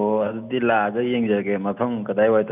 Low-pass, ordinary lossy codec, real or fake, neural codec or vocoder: 3.6 kHz; none; real; none